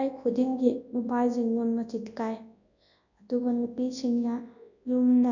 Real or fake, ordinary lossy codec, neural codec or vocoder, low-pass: fake; none; codec, 24 kHz, 0.9 kbps, WavTokenizer, large speech release; 7.2 kHz